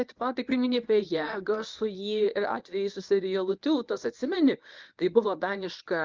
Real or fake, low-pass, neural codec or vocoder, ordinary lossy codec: fake; 7.2 kHz; codec, 24 kHz, 0.9 kbps, WavTokenizer, medium speech release version 1; Opus, 24 kbps